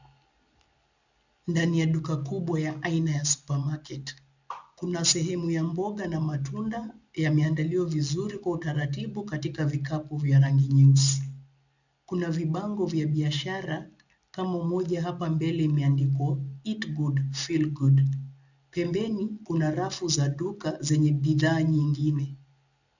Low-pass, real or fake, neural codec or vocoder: 7.2 kHz; real; none